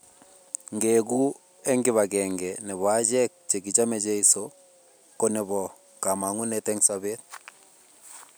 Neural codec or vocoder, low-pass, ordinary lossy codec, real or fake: none; none; none; real